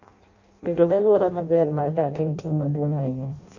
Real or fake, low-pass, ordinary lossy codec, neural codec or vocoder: fake; 7.2 kHz; none; codec, 16 kHz in and 24 kHz out, 0.6 kbps, FireRedTTS-2 codec